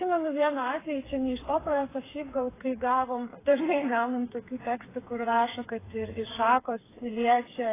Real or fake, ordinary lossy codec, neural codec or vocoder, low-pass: fake; AAC, 16 kbps; codec, 16 kHz, 4 kbps, FreqCodec, smaller model; 3.6 kHz